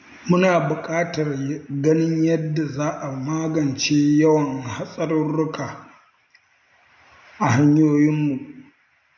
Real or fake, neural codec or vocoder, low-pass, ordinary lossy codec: real; none; 7.2 kHz; none